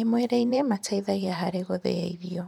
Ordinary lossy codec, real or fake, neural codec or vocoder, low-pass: none; fake; vocoder, 44.1 kHz, 128 mel bands every 512 samples, BigVGAN v2; 19.8 kHz